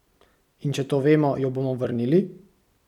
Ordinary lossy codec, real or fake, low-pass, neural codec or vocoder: none; fake; 19.8 kHz; vocoder, 44.1 kHz, 128 mel bands every 256 samples, BigVGAN v2